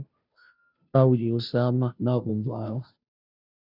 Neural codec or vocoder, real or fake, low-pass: codec, 16 kHz, 0.5 kbps, FunCodec, trained on Chinese and English, 25 frames a second; fake; 5.4 kHz